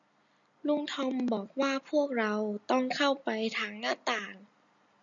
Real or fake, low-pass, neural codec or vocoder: real; 7.2 kHz; none